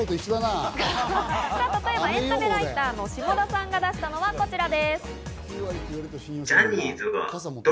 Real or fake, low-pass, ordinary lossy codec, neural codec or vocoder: real; none; none; none